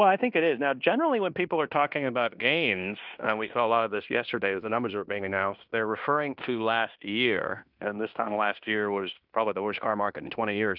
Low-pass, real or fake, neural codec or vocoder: 5.4 kHz; fake; codec, 16 kHz in and 24 kHz out, 0.9 kbps, LongCat-Audio-Codec, fine tuned four codebook decoder